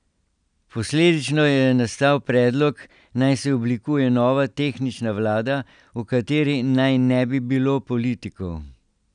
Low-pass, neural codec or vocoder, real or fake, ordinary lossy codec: 9.9 kHz; none; real; none